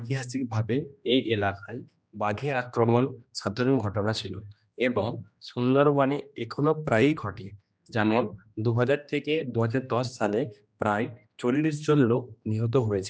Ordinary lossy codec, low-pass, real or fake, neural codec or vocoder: none; none; fake; codec, 16 kHz, 1 kbps, X-Codec, HuBERT features, trained on general audio